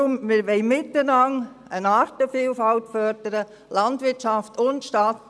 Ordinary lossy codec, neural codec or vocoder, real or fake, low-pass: none; none; real; none